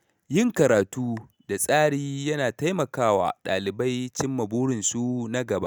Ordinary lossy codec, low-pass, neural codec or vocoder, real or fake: none; none; none; real